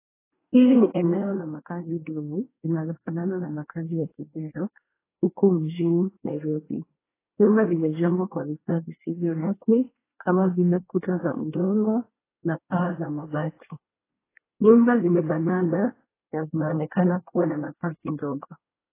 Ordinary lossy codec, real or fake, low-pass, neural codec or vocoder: AAC, 16 kbps; fake; 3.6 kHz; codec, 24 kHz, 1 kbps, SNAC